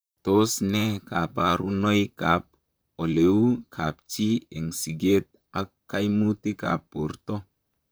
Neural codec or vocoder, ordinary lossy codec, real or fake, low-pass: vocoder, 44.1 kHz, 128 mel bands, Pupu-Vocoder; none; fake; none